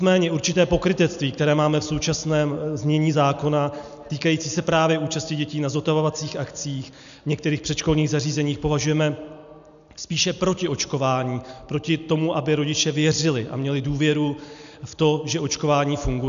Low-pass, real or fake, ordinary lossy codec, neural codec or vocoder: 7.2 kHz; real; MP3, 96 kbps; none